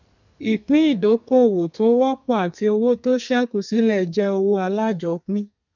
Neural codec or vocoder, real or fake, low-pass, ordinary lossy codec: codec, 32 kHz, 1.9 kbps, SNAC; fake; 7.2 kHz; none